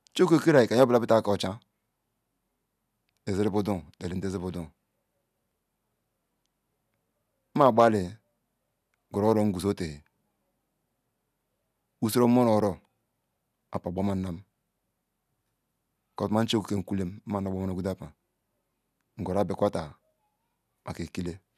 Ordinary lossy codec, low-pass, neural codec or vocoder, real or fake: none; 14.4 kHz; none; real